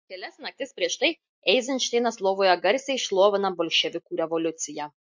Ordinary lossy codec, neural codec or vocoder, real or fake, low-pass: MP3, 48 kbps; none; real; 7.2 kHz